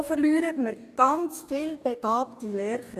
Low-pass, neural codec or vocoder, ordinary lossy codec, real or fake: 14.4 kHz; codec, 44.1 kHz, 2.6 kbps, DAC; none; fake